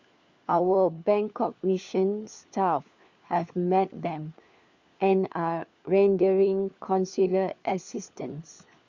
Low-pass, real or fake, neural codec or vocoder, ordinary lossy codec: 7.2 kHz; fake; codec, 16 kHz, 4 kbps, FunCodec, trained on LibriTTS, 50 frames a second; Opus, 64 kbps